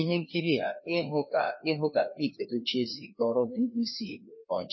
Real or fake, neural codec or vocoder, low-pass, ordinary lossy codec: fake; codec, 16 kHz, 2 kbps, FreqCodec, larger model; 7.2 kHz; MP3, 24 kbps